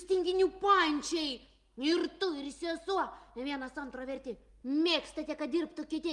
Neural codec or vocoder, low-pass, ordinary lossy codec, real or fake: none; 10.8 kHz; Opus, 32 kbps; real